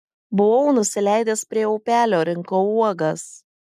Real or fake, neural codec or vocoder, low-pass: real; none; 14.4 kHz